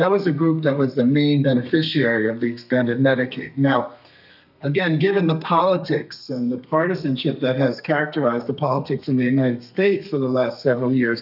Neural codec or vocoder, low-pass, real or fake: codec, 32 kHz, 1.9 kbps, SNAC; 5.4 kHz; fake